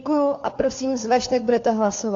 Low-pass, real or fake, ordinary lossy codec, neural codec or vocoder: 7.2 kHz; fake; AAC, 48 kbps; codec, 16 kHz, 4 kbps, FunCodec, trained on LibriTTS, 50 frames a second